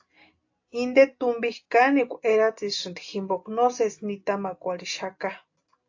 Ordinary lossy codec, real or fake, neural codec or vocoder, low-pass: AAC, 48 kbps; real; none; 7.2 kHz